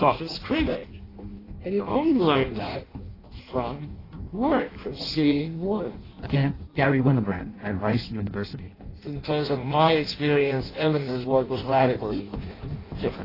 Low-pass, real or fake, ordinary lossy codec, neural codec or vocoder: 5.4 kHz; fake; AAC, 24 kbps; codec, 16 kHz in and 24 kHz out, 0.6 kbps, FireRedTTS-2 codec